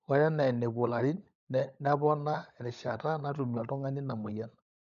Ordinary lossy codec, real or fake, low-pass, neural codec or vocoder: none; fake; 7.2 kHz; codec, 16 kHz, 16 kbps, FunCodec, trained on LibriTTS, 50 frames a second